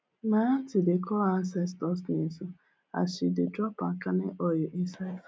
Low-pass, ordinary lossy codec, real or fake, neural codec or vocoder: none; none; real; none